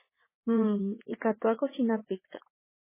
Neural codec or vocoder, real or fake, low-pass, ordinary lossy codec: vocoder, 22.05 kHz, 80 mel bands, WaveNeXt; fake; 3.6 kHz; MP3, 16 kbps